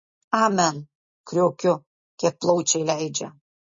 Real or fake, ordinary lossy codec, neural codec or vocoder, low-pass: real; MP3, 32 kbps; none; 7.2 kHz